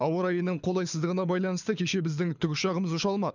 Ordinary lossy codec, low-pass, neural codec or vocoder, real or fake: none; 7.2 kHz; codec, 24 kHz, 6 kbps, HILCodec; fake